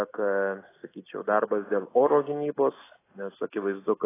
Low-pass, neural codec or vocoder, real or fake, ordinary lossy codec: 3.6 kHz; none; real; AAC, 16 kbps